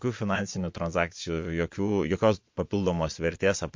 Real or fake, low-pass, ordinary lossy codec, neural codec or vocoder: fake; 7.2 kHz; MP3, 48 kbps; vocoder, 22.05 kHz, 80 mel bands, Vocos